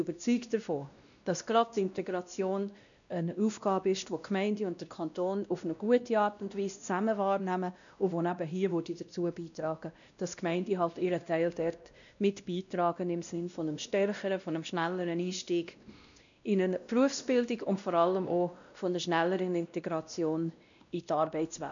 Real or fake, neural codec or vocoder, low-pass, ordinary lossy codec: fake; codec, 16 kHz, 1 kbps, X-Codec, WavLM features, trained on Multilingual LibriSpeech; 7.2 kHz; none